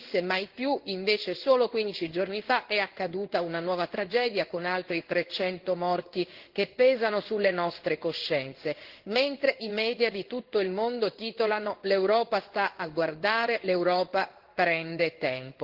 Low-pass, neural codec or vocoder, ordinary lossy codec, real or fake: 5.4 kHz; codec, 16 kHz in and 24 kHz out, 1 kbps, XY-Tokenizer; Opus, 16 kbps; fake